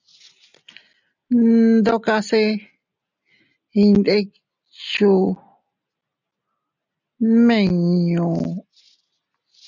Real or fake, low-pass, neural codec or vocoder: real; 7.2 kHz; none